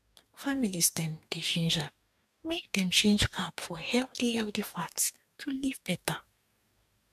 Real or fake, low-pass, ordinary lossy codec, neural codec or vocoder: fake; 14.4 kHz; none; codec, 44.1 kHz, 2.6 kbps, DAC